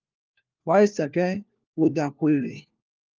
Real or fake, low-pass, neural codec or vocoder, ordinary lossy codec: fake; 7.2 kHz; codec, 16 kHz, 1 kbps, FunCodec, trained on LibriTTS, 50 frames a second; Opus, 24 kbps